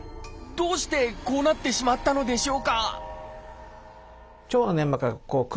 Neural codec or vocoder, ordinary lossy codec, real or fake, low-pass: none; none; real; none